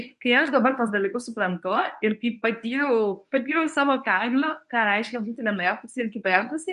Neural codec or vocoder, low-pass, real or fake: codec, 24 kHz, 0.9 kbps, WavTokenizer, medium speech release version 1; 10.8 kHz; fake